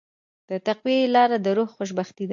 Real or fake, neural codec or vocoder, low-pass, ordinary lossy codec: real; none; 7.2 kHz; AAC, 64 kbps